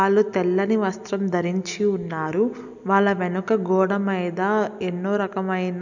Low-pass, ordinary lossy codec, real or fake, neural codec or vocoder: 7.2 kHz; none; real; none